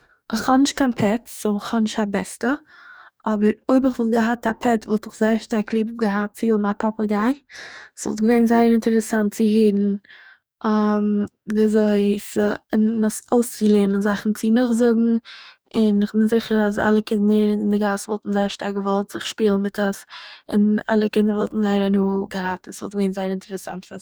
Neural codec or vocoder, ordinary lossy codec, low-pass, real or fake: codec, 44.1 kHz, 2.6 kbps, DAC; none; none; fake